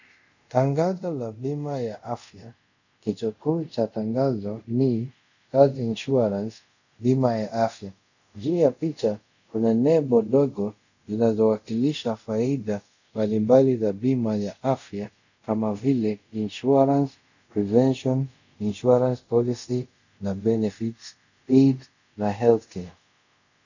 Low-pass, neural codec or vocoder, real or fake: 7.2 kHz; codec, 24 kHz, 0.5 kbps, DualCodec; fake